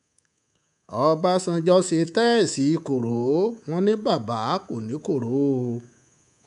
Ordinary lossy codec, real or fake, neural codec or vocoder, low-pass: none; fake; codec, 24 kHz, 3.1 kbps, DualCodec; 10.8 kHz